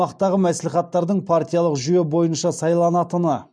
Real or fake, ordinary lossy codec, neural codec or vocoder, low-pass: real; none; none; none